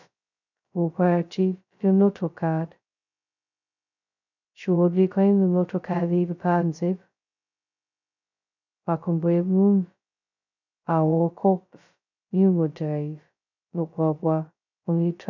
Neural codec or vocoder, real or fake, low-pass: codec, 16 kHz, 0.2 kbps, FocalCodec; fake; 7.2 kHz